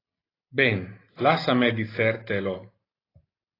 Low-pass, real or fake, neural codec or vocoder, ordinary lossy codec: 5.4 kHz; real; none; AAC, 24 kbps